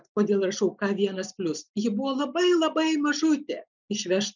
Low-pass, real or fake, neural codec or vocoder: 7.2 kHz; real; none